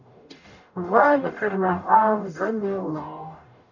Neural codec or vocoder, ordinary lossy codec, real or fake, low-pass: codec, 44.1 kHz, 0.9 kbps, DAC; none; fake; 7.2 kHz